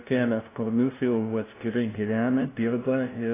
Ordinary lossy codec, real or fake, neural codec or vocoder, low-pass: AAC, 16 kbps; fake; codec, 16 kHz, 0.5 kbps, FunCodec, trained on LibriTTS, 25 frames a second; 3.6 kHz